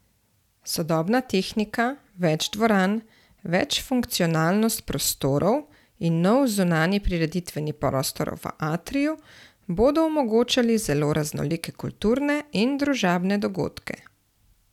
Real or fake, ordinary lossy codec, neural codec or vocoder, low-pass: real; none; none; 19.8 kHz